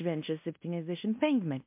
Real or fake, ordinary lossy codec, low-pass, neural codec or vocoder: fake; MP3, 32 kbps; 3.6 kHz; codec, 16 kHz in and 24 kHz out, 0.9 kbps, LongCat-Audio-Codec, fine tuned four codebook decoder